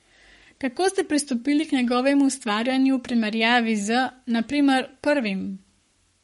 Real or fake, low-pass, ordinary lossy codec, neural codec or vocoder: fake; 19.8 kHz; MP3, 48 kbps; codec, 44.1 kHz, 7.8 kbps, Pupu-Codec